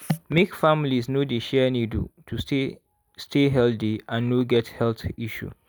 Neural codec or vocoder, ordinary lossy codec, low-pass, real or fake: none; none; none; real